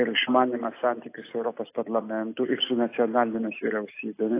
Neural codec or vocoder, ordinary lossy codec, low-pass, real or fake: none; AAC, 24 kbps; 3.6 kHz; real